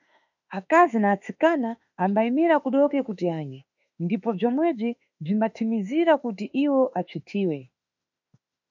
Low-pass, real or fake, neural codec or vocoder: 7.2 kHz; fake; autoencoder, 48 kHz, 32 numbers a frame, DAC-VAE, trained on Japanese speech